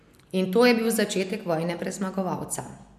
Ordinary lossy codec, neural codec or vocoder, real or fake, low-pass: MP3, 96 kbps; none; real; 14.4 kHz